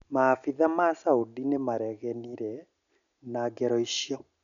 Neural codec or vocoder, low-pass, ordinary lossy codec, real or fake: none; 7.2 kHz; none; real